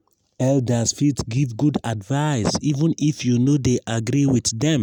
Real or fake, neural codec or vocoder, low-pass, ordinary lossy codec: real; none; 19.8 kHz; none